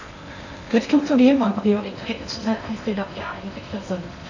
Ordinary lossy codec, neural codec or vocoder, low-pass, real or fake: none; codec, 16 kHz in and 24 kHz out, 0.6 kbps, FocalCodec, streaming, 4096 codes; 7.2 kHz; fake